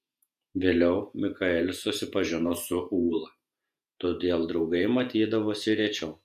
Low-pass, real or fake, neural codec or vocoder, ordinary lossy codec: 14.4 kHz; fake; vocoder, 48 kHz, 128 mel bands, Vocos; AAC, 96 kbps